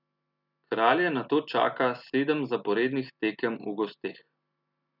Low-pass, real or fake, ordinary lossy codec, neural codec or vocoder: 5.4 kHz; real; none; none